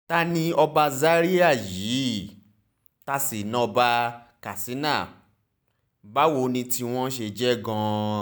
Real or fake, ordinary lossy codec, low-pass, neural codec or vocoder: real; none; none; none